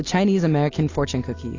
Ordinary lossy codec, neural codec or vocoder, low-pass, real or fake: AAC, 32 kbps; none; 7.2 kHz; real